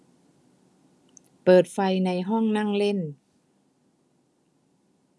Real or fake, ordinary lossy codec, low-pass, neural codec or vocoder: real; none; none; none